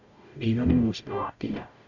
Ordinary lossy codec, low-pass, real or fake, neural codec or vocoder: none; 7.2 kHz; fake; codec, 44.1 kHz, 0.9 kbps, DAC